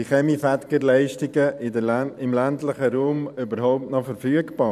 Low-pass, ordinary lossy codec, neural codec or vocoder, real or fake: 14.4 kHz; none; vocoder, 44.1 kHz, 128 mel bands every 512 samples, BigVGAN v2; fake